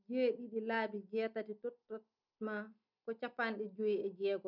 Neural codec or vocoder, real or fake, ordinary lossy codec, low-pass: none; real; none; 5.4 kHz